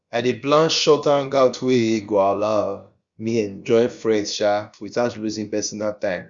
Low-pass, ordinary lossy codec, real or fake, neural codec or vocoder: 7.2 kHz; Opus, 64 kbps; fake; codec, 16 kHz, about 1 kbps, DyCAST, with the encoder's durations